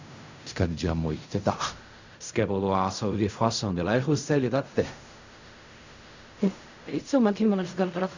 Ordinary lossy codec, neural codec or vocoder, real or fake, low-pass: Opus, 64 kbps; codec, 16 kHz in and 24 kHz out, 0.4 kbps, LongCat-Audio-Codec, fine tuned four codebook decoder; fake; 7.2 kHz